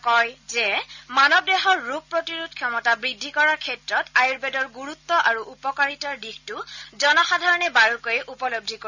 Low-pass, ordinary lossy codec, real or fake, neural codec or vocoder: 7.2 kHz; none; real; none